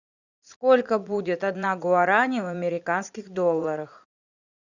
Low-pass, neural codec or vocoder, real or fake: 7.2 kHz; vocoder, 44.1 kHz, 80 mel bands, Vocos; fake